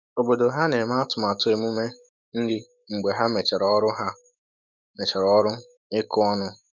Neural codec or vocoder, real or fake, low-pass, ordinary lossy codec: autoencoder, 48 kHz, 128 numbers a frame, DAC-VAE, trained on Japanese speech; fake; 7.2 kHz; none